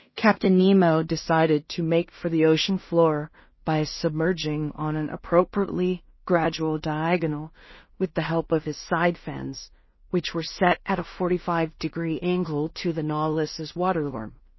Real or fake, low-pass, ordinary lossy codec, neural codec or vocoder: fake; 7.2 kHz; MP3, 24 kbps; codec, 16 kHz in and 24 kHz out, 0.4 kbps, LongCat-Audio-Codec, two codebook decoder